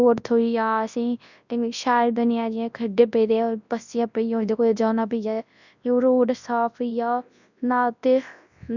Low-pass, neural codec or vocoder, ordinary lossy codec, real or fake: 7.2 kHz; codec, 24 kHz, 0.9 kbps, WavTokenizer, large speech release; none; fake